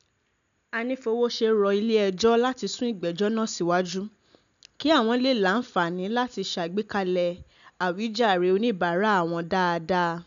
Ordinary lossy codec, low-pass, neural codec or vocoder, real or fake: none; 7.2 kHz; none; real